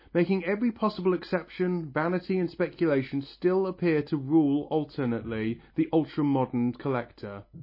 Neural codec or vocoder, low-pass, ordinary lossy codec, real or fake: none; 5.4 kHz; MP3, 24 kbps; real